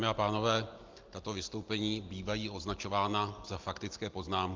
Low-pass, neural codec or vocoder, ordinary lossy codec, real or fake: 7.2 kHz; none; Opus, 24 kbps; real